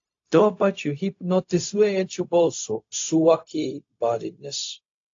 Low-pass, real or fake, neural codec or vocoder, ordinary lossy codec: 7.2 kHz; fake; codec, 16 kHz, 0.4 kbps, LongCat-Audio-Codec; AAC, 48 kbps